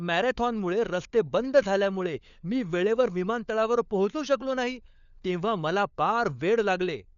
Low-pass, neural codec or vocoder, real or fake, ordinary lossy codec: 7.2 kHz; codec, 16 kHz, 4 kbps, FunCodec, trained on LibriTTS, 50 frames a second; fake; none